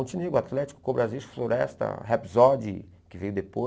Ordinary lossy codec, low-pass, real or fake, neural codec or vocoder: none; none; real; none